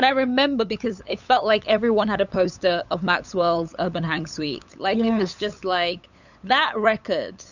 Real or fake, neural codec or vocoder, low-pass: fake; codec, 16 kHz, 8 kbps, FunCodec, trained on LibriTTS, 25 frames a second; 7.2 kHz